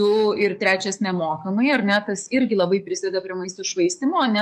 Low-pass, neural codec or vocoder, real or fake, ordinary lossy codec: 14.4 kHz; codec, 44.1 kHz, 7.8 kbps, DAC; fake; MP3, 64 kbps